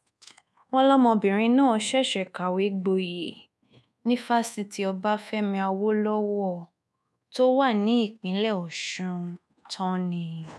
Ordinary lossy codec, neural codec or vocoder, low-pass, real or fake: none; codec, 24 kHz, 1.2 kbps, DualCodec; none; fake